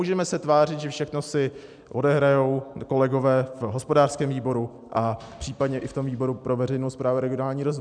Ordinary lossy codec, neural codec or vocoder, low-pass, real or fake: MP3, 96 kbps; none; 9.9 kHz; real